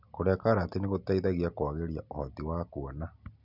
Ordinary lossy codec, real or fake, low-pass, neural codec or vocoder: none; real; 5.4 kHz; none